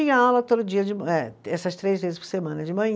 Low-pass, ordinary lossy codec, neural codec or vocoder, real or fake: none; none; none; real